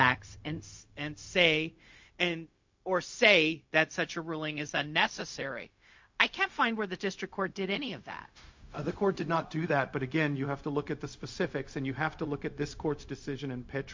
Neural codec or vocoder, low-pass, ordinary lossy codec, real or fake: codec, 16 kHz, 0.4 kbps, LongCat-Audio-Codec; 7.2 kHz; MP3, 48 kbps; fake